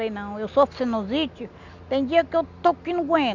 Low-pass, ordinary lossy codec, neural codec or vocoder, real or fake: 7.2 kHz; none; none; real